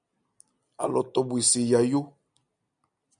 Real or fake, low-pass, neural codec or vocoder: real; 9.9 kHz; none